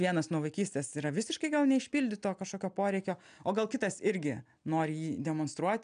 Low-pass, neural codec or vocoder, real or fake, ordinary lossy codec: 9.9 kHz; none; real; MP3, 96 kbps